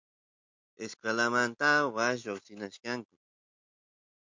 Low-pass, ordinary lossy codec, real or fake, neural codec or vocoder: 7.2 kHz; MP3, 64 kbps; real; none